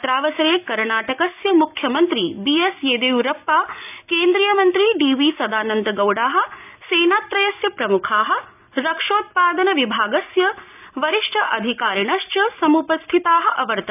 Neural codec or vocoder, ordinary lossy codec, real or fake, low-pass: none; none; real; 3.6 kHz